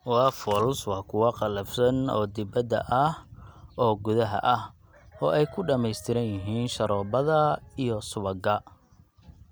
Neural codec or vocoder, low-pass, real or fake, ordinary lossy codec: none; none; real; none